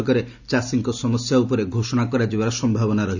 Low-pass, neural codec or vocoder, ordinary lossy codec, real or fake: 7.2 kHz; none; none; real